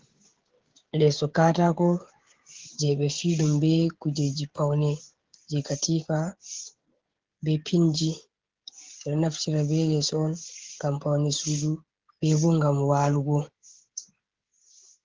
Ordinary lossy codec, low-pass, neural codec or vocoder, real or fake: Opus, 16 kbps; 7.2 kHz; codec, 16 kHz, 16 kbps, FreqCodec, smaller model; fake